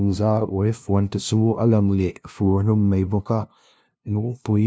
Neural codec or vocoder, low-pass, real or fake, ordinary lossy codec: codec, 16 kHz, 0.5 kbps, FunCodec, trained on LibriTTS, 25 frames a second; none; fake; none